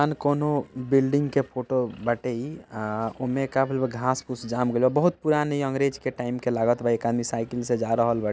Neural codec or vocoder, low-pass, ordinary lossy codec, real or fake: none; none; none; real